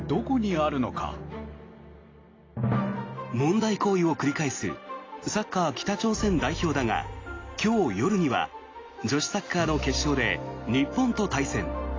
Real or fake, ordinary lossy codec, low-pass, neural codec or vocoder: real; AAC, 32 kbps; 7.2 kHz; none